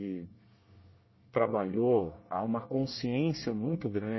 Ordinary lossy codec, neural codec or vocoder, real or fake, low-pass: MP3, 24 kbps; codec, 24 kHz, 1 kbps, SNAC; fake; 7.2 kHz